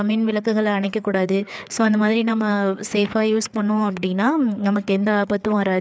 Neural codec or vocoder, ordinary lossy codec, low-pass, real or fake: codec, 16 kHz, 4 kbps, FreqCodec, larger model; none; none; fake